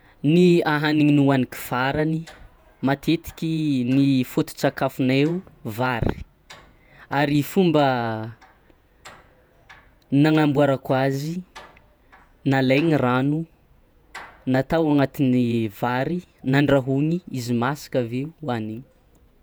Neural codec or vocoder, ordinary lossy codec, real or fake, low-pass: vocoder, 48 kHz, 128 mel bands, Vocos; none; fake; none